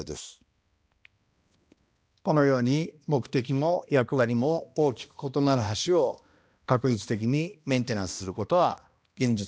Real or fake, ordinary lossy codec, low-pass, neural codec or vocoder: fake; none; none; codec, 16 kHz, 1 kbps, X-Codec, HuBERT features, trained on balanced general audio